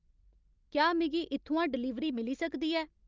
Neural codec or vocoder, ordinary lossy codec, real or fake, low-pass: none; Opus, 32 kbps; real; 7.2 kHz